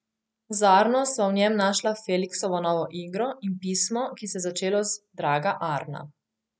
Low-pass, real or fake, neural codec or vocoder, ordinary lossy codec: none; real; none; none